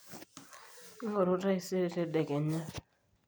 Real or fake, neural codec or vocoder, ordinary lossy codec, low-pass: real; none; none; none